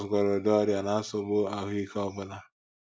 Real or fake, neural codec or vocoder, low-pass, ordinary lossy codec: real; none; none; none